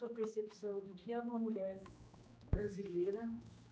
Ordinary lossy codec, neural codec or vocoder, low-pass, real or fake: none; codec, 16 kHz, 1 kbps, X-Codec, HuBERT features, trained on general audio; none; fake